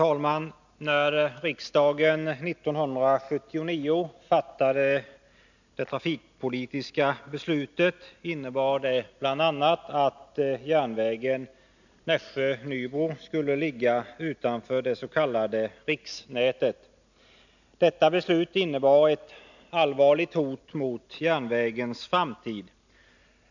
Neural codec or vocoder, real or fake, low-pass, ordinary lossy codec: none; real; 7.2 kHz; none